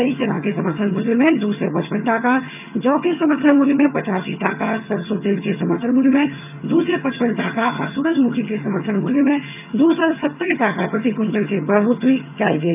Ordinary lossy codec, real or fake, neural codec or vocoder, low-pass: none; fake; vocoder, 22.05 kHz, 80 mel bands, HiFi-GAN; 3.6 kHz